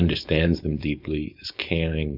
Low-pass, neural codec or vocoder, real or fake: 5.4 kHz; codec, 16 kHz, 4.8 kbps, FACodec; fake